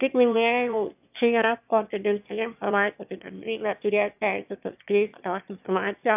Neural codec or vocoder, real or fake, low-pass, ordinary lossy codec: autoencoder, 22.05 kHz, a latent of 192 numbers a frame, VITS, trained on one speaker; fake; 3.6 kHz; AAC, 32 kbps